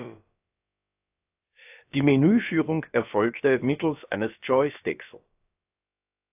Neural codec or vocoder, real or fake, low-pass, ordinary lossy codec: codec, 16 kHz, about 1 kbps, DyCAST, with the encoder's durations; fake; 3.6 kHz; AAC, 32 kbps